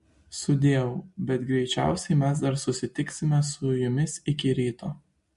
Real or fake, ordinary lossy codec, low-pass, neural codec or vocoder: real; MP3, 48 kbps; 10.8 kHz; none